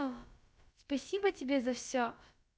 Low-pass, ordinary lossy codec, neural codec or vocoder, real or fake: none; none; codec, 16 kHz, about 1 kbps, DyCAST, with the encoder's durations; fake